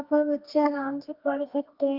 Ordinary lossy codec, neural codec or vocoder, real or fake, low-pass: Opus, 24 kbps; codec, 24 kHz, 0.9 kbps, WavTokenizer, medium music audio release; fake; 5.4 kHz